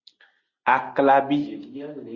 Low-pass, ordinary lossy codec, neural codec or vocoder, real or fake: 7.2 kHz; Opus, 64 kbps; none; real